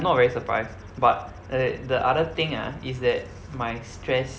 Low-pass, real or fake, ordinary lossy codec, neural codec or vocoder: none; real; none; none